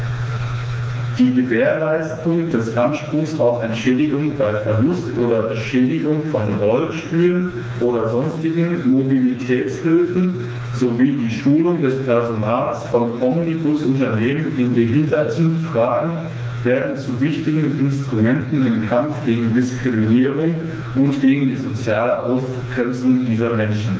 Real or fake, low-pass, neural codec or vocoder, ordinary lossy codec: fake; none; codec, 16 kHz, 2 kbps, FreqCodec, smaller model; none